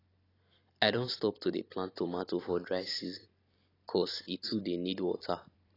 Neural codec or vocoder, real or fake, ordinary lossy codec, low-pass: vocoder, 44.1 kHz, 128 mel bands every 256 samples, BigVGAN v2; fake; AAC, 24 kbps; 5.4 kHz